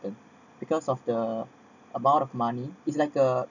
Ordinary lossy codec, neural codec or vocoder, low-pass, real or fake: none; none; 7.2 kHz; real